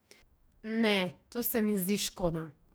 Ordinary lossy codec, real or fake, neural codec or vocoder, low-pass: none; fake; codec, 44.1 kHz, 2.6 kbps, DAC; none